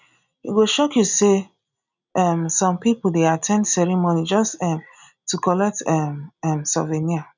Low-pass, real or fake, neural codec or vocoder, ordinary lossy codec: 7.2 kHz; real; none; none